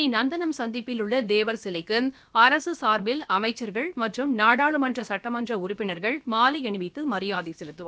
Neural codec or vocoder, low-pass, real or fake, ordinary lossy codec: codec, 16 kHz, about 1 kbps, DyCAST, with the encoder's durations; none; fake; none